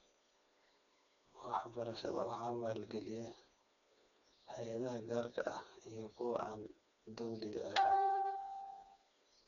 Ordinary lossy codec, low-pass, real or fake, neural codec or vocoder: none; 7.2 kHz; fake; codec, 16 kHz, 2 kbps, FreqCodec, smaller model